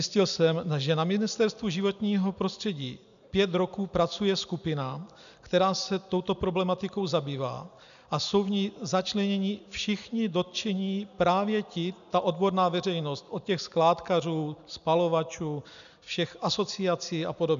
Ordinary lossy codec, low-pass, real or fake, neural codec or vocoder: MP3, 96 kbps; 7.2 kHz; real; none